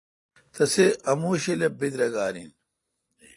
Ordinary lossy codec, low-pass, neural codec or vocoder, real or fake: AAC, 48 kbps; 10.8 kHz; none; real